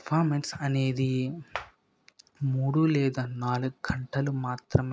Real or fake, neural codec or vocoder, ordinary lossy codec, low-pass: real; none; none; none